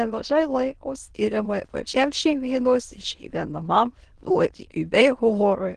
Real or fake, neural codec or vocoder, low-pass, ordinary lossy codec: fake; autoencoder, 22.05 kHz, a latent of 192 numbers a frame, VITS, trained on many speakers; 9.9 kHz; Opus, 16 kbps